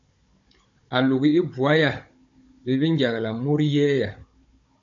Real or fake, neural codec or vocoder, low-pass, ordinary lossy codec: fake; codec, 16 kHz, 16 kbps, FunCodec, trained on Chinese and English, 50 frames a second; 7.2 kHz; AAC, 64 kbps